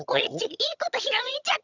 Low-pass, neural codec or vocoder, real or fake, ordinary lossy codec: 7.2 kHz; vocoder, 22.05 kHz, 80 mel bands, HiFi-GAN; fake; none